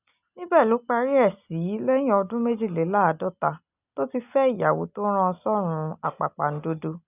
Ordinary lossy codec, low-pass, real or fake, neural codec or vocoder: none; 3.6 kHz; real; none